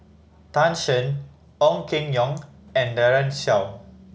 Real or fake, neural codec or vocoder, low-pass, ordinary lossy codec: real; none; none; none